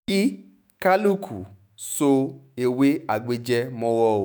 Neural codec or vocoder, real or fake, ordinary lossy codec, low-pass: autoencoder, 48 kHz, 128 numbers a frame, DAC-VAE, trained on Japanese speech; fake; none; none